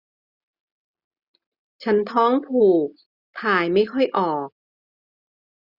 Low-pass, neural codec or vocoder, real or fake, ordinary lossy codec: 5.4 kHz; none; real; none